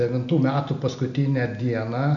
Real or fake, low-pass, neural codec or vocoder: real; 7.2 kHz; none